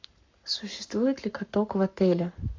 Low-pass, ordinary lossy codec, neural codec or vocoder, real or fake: 7.2 kHz; MP3, 48 kbps; codec, 44.1 kHz, 7.8 kbps, Pupu-Codec; fake